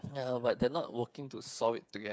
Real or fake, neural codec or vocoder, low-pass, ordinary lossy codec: fake; codec, 16 kHz, 8 kbps, FreqCodec, smaller model; none; none